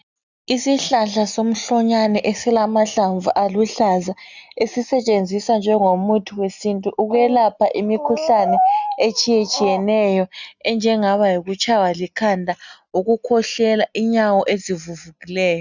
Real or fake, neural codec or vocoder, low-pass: real; none; 7.2 kHz